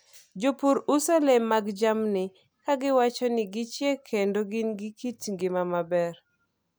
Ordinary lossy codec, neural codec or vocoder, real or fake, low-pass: none; none; real; none